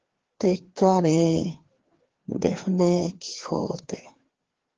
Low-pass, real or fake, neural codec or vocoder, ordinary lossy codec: 7.2 kHz; fake; codec, 16 kHz, 2 kbps, FreqCodec, larger model; Opus, 16 kbps